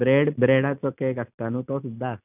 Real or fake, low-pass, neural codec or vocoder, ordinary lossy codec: real; 3.6 kHz; none; none